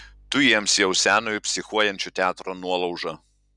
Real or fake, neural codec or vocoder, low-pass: real; none; 10.8 kHz